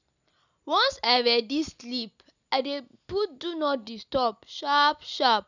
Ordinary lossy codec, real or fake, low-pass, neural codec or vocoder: none; real; 7.2 kHz; none